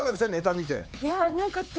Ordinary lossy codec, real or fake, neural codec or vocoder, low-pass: none; fake; codec, 16 kHz, 4 kbps, X-Codec, HuBERT features, trained on LibriSpeech; none